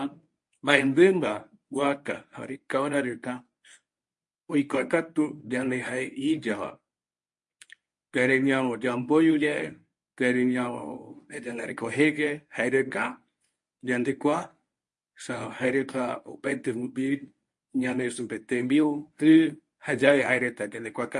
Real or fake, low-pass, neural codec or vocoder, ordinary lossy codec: fake; 10.8 kHz; codec, 24 kHz, 0.9 kbps, WavTokenizer, medium speech release version 1; MP3, 48 kbps